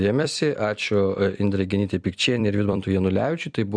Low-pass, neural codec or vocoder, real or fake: 9.9 kHz; vocoder, 44.1 kHz, 128 mel bands every 256 samples, BigVGAN v2; fake